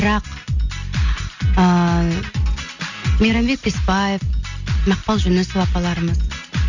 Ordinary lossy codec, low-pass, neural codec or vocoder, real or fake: none; 7.2 kHz; none; real